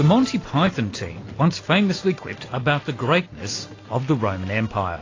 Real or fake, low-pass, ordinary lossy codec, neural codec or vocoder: real; 7.2 kHz; AAC, 32 kbps; none